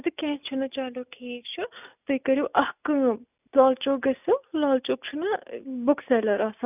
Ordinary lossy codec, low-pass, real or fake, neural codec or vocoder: none; 3.6 kHz; real; none